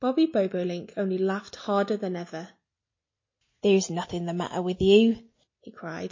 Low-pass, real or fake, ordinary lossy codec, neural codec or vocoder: 7.2 kHz; real; MP3, 32 kbps; none